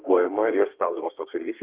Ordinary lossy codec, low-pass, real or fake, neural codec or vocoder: Opus, 32 kbps; 3.6 kHz; fake; codec, 32 kHz, 1.9 kbps, SNAC